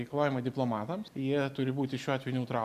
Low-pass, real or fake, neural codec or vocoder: 14.4 kHz; real; none